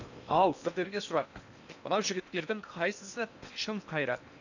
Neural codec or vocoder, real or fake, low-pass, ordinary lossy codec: codec, 16 kHz in and 24 kHz out, 0.8 kbps, FocalCodec, streaming, 65536 codes; fake; 7.2 kHz; none